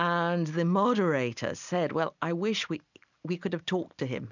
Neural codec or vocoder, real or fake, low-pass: none; real; 7.2 kHz